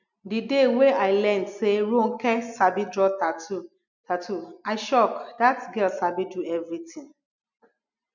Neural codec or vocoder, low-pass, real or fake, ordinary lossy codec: none; 7.2 kHz; real; none